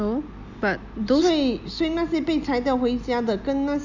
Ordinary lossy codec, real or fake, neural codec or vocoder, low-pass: none; real; none; 7.2 kHz